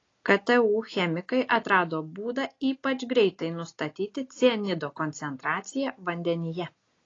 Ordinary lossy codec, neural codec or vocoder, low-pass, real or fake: AAC, 32 kbps; none; 7.2 kHz; real